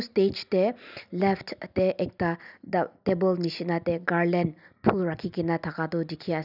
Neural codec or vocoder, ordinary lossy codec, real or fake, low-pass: none; none; real; 5.4 kHz